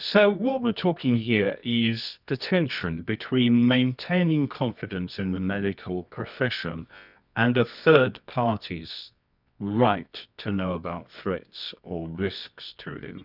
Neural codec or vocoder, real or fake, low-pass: codec, 24 kHz, 0.9 kbps, WavTokenizer, medium music audio release; fake; 5.4 kHz